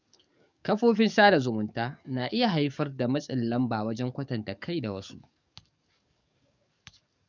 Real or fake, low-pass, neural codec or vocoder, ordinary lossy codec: fake; 7.2 kHz; codec, 44.1 kHz, 7.8 kbps, DAC; none